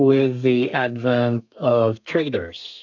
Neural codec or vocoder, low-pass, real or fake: codec, 32 kHz, 1.9 kbps, SNAC; 7.2 kHz; fake